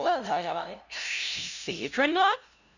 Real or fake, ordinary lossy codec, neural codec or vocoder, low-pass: fake; none; codec, 16 kHz, 0.5 kbps, FunCodec, trained on LibriTTS, 25 frames a second; 7.2 kHz